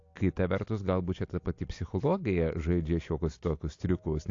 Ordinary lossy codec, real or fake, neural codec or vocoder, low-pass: AAC, 48 kbps; real; none; 7.2 kHz